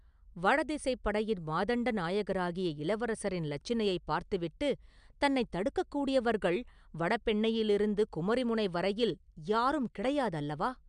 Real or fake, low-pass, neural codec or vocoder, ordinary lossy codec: real; 9.9 kHz; none; MP3, 96 kbps